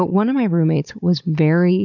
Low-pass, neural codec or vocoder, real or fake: 7.2 kHz; codec, 16 kHz, 16 kbps, FunCodec, trained on Chinese and English, 50 frames a second; fake